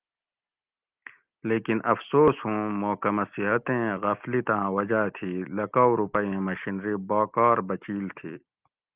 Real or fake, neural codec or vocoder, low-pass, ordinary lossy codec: real; none; 3.6 kHz; Opus, 24 kbps